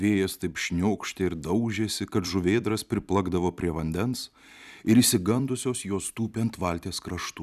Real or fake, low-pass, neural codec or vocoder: fake; 14.4 kHz; vocoder, 44.1 kHz, 128 mel bands every 256 samples, BigVGAN v2